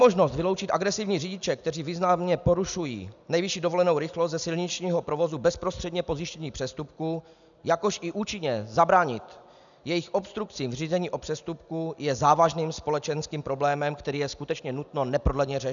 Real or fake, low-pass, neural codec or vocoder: real; 7.2 kHz; none